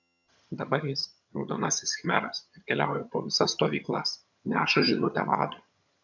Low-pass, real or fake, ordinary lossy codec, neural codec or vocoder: 7.2 kHz; fake; MP3, 64 kbps; vocoder, 22.05 kHz, 80 mel bands, HiFi-GAN